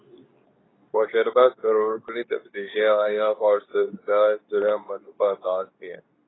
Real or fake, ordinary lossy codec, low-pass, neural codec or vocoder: fake; AAC, 16 kbps; 7.2 kHz; codec, 24 kHz, 0.9 kbps, WavTokenizer, medium speech release version 2